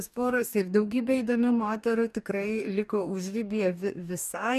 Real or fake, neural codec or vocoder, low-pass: fake; codec, 44.1 kHz, 2.6 kbps, DAC; 14.4 kHz